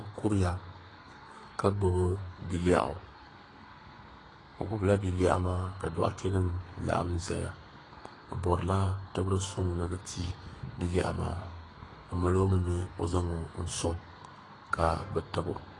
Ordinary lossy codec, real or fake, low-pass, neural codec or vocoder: AAC, 32 kbps; fake; 10.8 kHz; codec, 32 kHz, 1.9 kbps, SNAC